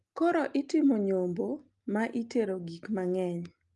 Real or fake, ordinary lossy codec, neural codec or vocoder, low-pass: real; Opus, 32 kbps; none; 10.8 kHz